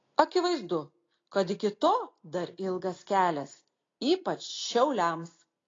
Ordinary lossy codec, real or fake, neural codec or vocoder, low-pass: AAC, 32 kbps; real; none; 7.2 kHz